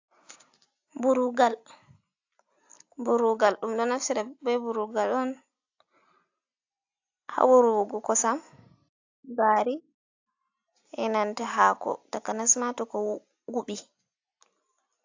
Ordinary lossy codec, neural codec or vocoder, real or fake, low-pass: AAC, 48 kbps; none; real; 7.2 kHz